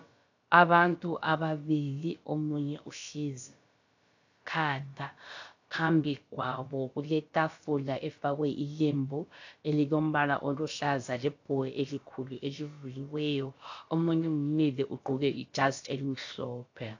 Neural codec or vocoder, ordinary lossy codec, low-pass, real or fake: codec, 16 kHz, about 1 kbps, DyCAST, with the encoder's durations; AAC, 48 kbps; 7.2 kHz; fake